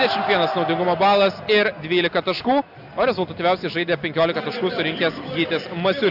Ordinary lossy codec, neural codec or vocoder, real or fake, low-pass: AAC, 48 kbps; none; real; 5.4 kHz